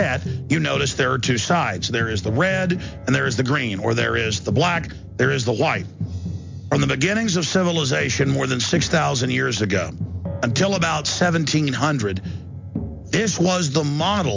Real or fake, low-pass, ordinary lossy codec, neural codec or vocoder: real; 7.2 kHz; MP3, 64 kbps; none